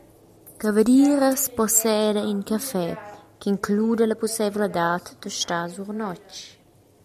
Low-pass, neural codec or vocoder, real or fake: 14.4 kHz; none; real